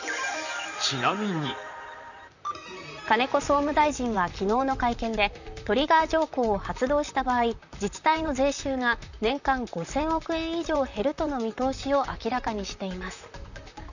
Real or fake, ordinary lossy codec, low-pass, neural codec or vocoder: fake; none; 7.2 kHz; vocoder, 44.1 kHz, 128 mel bands, Pupu-Vocoder